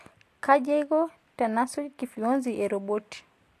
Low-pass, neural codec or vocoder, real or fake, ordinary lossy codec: 14.4 kHz; none; real; AAC, 64 kbps